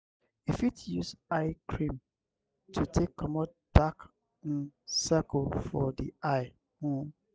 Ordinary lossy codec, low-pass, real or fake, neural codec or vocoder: none; none; real; none